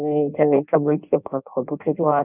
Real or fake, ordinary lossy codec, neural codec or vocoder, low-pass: fake; none; codec, 16 kHz in and 24 kHz out, 0.6 kbps, FireRedTTS-2 codec; 3.6 kHz